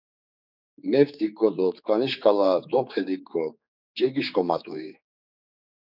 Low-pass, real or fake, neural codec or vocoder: 5.4 kHz; fake; codec, 16 kHz, 4 kbps, X-Codec, HuBERT features, trained on general audio